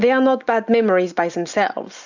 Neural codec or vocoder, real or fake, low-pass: none; real; 7.2 kHz